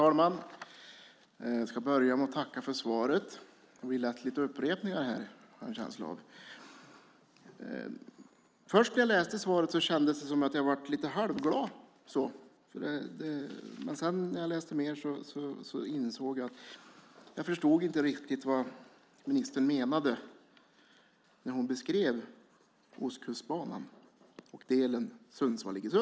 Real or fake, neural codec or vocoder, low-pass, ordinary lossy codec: real; none; none; none